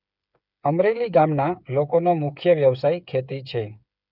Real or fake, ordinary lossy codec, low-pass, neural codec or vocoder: fake; none; 5.4 kHz; codec, 16 kHz, 8 kbps, FreqCodec, smaller model